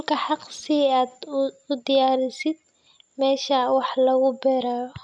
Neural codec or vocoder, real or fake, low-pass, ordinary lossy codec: none; real; none; none